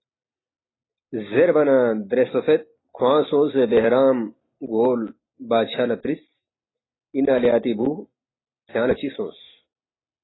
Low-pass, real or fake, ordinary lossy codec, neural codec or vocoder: 7.2 kHz; real; AAC, 16 kbps; none